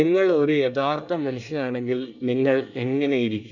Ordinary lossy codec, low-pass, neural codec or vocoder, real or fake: none; 7.2 kHz; codec, 24 kHz, 1 kbps, SNAC; fake